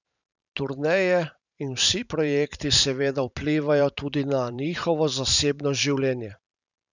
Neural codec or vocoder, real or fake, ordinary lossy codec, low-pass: vocoder, 44.1 kHz, 128 mel bands every 512 samples, BigVGAN v2; fake; none; 7.2 kHz